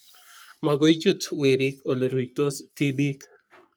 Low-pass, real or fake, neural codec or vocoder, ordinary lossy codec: none; fake; codec, 44.1 kHz, 3.4 kbps, Pupu-Codec; none